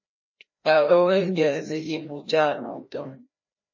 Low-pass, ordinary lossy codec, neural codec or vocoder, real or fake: 7.2 kHz; MP3, 32 kbps; codec, 16 kHz, 1 kbps, FreqCodec, larger model; fake